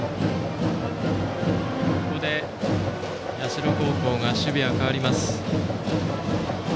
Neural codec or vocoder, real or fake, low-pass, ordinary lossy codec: none; real; none; none